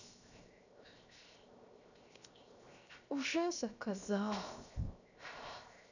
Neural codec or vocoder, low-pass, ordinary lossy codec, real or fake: codec, 16 kHz, 0.7 kbps, FocalCodec; 7.2 kHz; none; fake